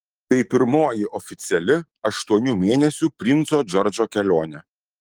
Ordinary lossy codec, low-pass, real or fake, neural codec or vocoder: Opus, 24 kbps; 19.8 kHz; fake; codec, 44.1 kHz, 7.8 kbps, DAC